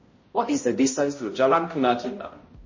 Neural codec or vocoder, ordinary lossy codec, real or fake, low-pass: codec, 16 kHz, 0.5 kbps, X-Codec, HuBERT features, trained on balanced general audio; MP3, 32 kbps; fake; 7.2 kHz